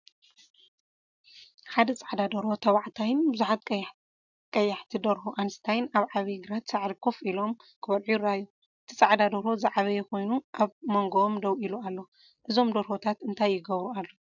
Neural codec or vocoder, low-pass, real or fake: none; 7.2 kHz; real